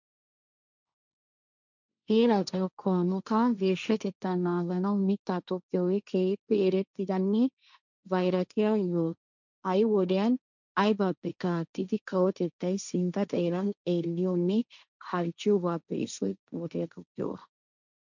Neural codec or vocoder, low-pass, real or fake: codec, 16 kHz, 1.1 kbps, Voila-Tokenizer; 7.2 kHz; fake